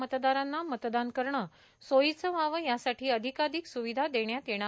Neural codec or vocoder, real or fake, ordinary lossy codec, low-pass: none; real; none; none